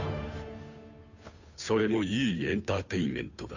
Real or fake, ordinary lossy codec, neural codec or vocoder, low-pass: fake; none; codec, 16 kHz, 2 kbps, FunCodec, trained on Chinese and English, 25 frames a second; 7.2 kHz